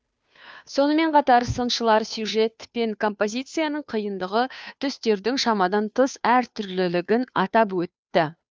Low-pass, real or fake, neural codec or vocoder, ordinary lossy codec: none; fake; codec, 16 kHz, 2 kbps, FunCodec, trained on Chinese and English, 25 frames a second; none